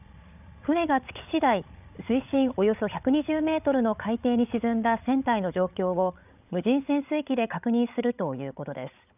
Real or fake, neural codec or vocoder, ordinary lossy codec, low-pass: fake; codec, 16 kHz, 8 kbps, FreqCodec, larger model; none; 3.6 kHz